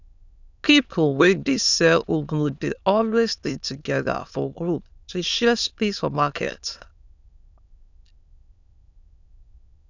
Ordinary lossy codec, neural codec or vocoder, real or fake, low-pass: none; autoencoder, 22.05 kHz, a latent of 192 numbers a frame, VITS, trained on many speakers; fake; 7.2 kHz